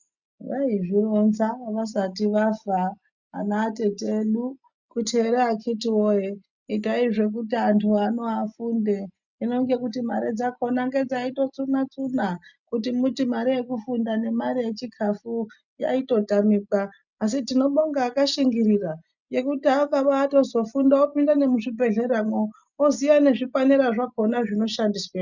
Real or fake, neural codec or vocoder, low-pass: real; none; 7.2 kHz